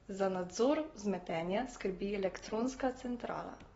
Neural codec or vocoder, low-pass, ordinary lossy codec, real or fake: none; 19.8 kHz; AAC, 24 kbps; real